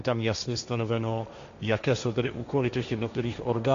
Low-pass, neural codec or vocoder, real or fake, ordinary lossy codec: 7.2 kHz; codec, 16 kHz, 1.1 kbps, Voila-Tokenizer; fake; MP3, 64 kbps